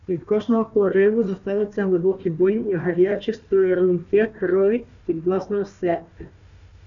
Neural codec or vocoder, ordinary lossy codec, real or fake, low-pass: codec, 16 kHz, 1 kbps, FunCodec, trained on Chinese and English, 50 frames a second; MP3, 96 kbps; fake; 7.2 kHz